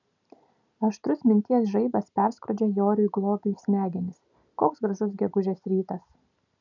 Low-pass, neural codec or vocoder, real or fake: 7.2 kHz; none; real